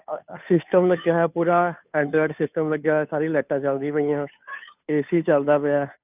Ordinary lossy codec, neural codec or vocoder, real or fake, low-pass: none; codec, 16 kHz, 2 kbps, FunCodec, trained on Chinese and English, 25 frames a second; fake; 3.6 kHz